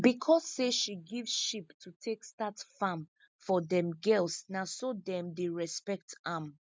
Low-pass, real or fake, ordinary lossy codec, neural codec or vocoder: none; real; none; none